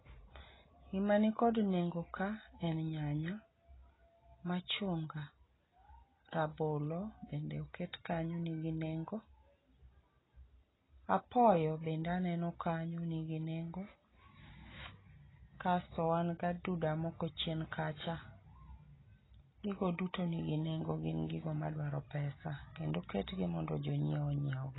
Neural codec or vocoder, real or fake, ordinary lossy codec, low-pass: none; real; AAC, 16 kbps; 7.2 kHz